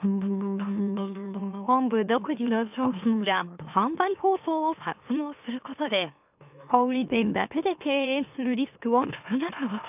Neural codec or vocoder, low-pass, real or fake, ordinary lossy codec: autoencoder, 44.1 kHz, a latent of 192 numbers a frame, MeloTTS; 3.6 kHz; fake; none